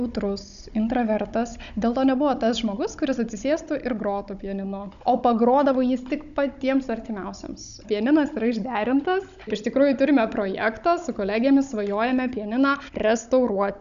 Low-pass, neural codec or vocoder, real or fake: 7.2 kHz; codec, 16 kHz, 16 kbps, FunCodec, trained on Chinese and English, 50 frames a second; fake